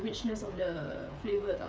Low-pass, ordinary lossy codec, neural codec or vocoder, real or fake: none; none; codec, 16 kHz, 8 kbps, FreqCodec, smaller model; fake